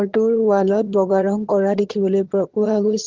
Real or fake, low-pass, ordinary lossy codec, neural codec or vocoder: fake; 7.2 kHz; Opus, 16 kbps; vocoder, 22.05 kHz, 80 mel bands, HiFi-GAN